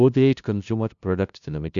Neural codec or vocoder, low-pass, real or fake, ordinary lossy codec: codec, 16 kHz, 0.5 kbps, FunCodec, trained on LibriTTS, 25 frames a second; 7.2 kHz; fake; none